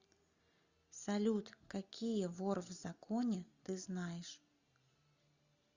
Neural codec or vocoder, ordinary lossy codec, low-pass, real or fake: none; Opus, 64 kbps; 7.2 kHz; real